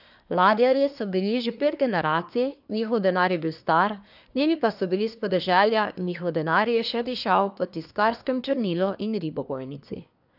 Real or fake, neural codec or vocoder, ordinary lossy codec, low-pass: fake; codec, 24 kHz, 1 kbps, SNAC; none; 5.4 kHz